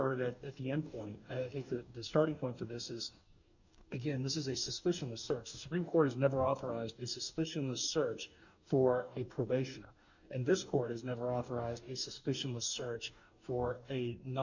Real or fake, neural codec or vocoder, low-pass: fake; codec, 44.1 kHz, 2.6 kbps, DAC; 7.2 kHz